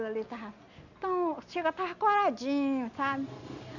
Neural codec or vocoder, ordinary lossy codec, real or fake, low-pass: none; none; real; 7.2 kHz